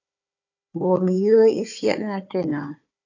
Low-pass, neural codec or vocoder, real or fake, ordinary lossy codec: 7.2 kHz; codec, 16 kHz, 4 kbps, FunCodec, trained on Chinese and English, 50 frames a second; fake; AAC, 48 kbps